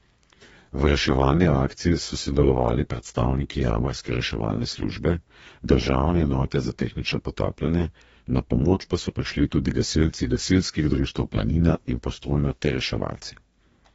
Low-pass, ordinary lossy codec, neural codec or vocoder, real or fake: 14.4 kHz; AAC, 24 kbps; codec, 32 kHz, 1.9 kbps, SNAC; fake